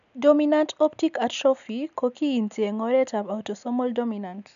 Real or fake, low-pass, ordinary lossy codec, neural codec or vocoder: real; 7.2 kHz; none; none